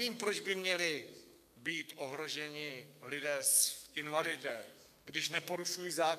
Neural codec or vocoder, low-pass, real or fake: codec, 32 kHz, 1.9 kbps, SNAC; 14.4 kHz; fake